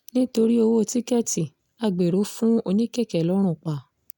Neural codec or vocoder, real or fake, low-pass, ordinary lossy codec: none; real; none; none